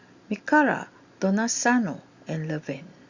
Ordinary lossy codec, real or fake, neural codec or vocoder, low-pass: Opus, 64 kbps; real; none; 7.2 kHz